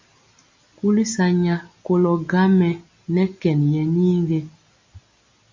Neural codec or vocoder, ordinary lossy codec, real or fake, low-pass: none; MP3, 48 kbps; real; 7.2 kHz